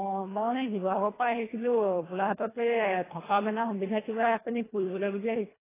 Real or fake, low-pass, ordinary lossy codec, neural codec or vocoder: fake; 3.6 kHz; AAC, 16 kbps; codec, 24 kHz, 1.5 kbps, HILCodec